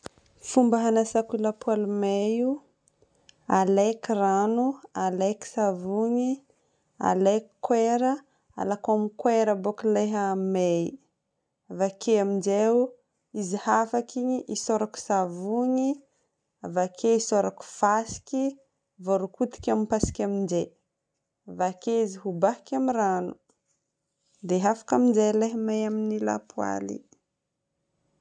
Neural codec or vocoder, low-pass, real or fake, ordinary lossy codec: none; 9.9 kHz; real; none